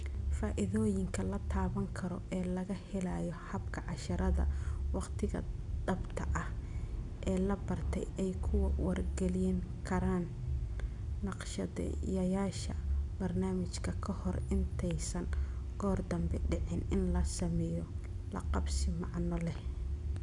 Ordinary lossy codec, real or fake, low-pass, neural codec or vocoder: none; real; 10.8 kHz; none